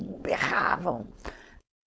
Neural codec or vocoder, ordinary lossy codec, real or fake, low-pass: codec, 16 kHz, 4.8 kbps, FACodec; none; fake; none